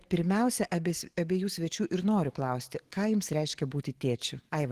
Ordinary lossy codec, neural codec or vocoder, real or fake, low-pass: Opus, 16 kbps; autoencoder, 48 kHz, 128 numbers a frame, DAC-VAE, trained on Japanese speech; fake; 14.4 kHz